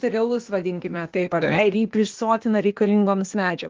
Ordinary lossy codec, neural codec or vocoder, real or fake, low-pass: Opus, 24 kbps; codec, 16 kHz, 0.8 kbps, ZipCodec; fake; 7.2 kHz